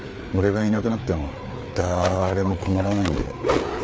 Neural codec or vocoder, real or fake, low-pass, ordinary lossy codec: codec, 16 kHz, 4 kbps, FreqCodec, larger model; fake; none; none